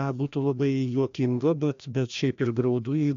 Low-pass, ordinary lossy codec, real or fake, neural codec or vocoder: 7.2 kHz; MP3, 64 kbps; fake; codec, 16 kHz, 1 kbps, FreqCodec, larger model